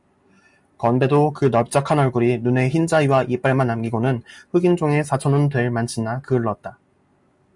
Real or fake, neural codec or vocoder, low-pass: real; none; 10.8 kHz